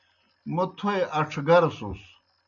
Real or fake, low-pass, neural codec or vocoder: real; 7.2 kHz; none